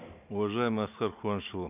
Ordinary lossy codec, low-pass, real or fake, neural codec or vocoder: none; 3.6 kHz; real; none